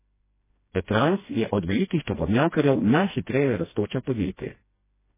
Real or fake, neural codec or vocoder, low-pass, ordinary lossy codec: fake; codec, 16 kHz, 1 kbps, FreqCodec, smaller model; 3.6 kHz; MP3, 16 kbps